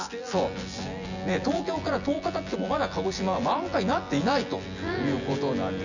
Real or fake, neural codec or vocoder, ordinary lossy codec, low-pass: fake; vocoder, 24 kHz, 100 mel bands, Vocos; none; 7.2 kHz